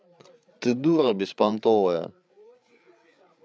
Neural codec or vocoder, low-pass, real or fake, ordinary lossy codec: codec, 16 kHz, 8 kbps, FreqCodec, larger model; none; fake; none